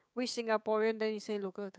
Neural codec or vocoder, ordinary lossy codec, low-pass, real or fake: codec, 16 kHz, 6 kbps, DAC; none; none; fake